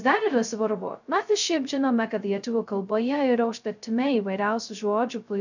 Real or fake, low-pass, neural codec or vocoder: fake; 7.2 kHz; codec, 16 kHz, 0.2 kbps, FocalCodec